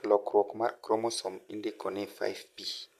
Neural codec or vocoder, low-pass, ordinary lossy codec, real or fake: none; 14.4 kHz; none; real